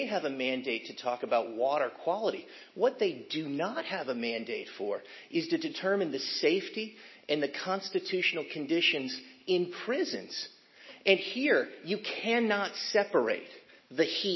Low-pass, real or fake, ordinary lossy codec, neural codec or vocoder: 7.2 kHz; real; MP3, 24 kbps; none